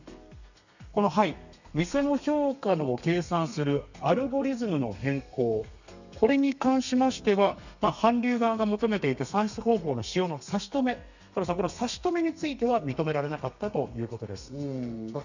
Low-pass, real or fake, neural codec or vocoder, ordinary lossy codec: 7.2 kHz; fake; codec, 32 kHz, 1.9 kbps, SNAC; none